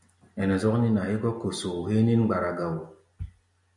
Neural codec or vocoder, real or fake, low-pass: none; real; 10.8 kHz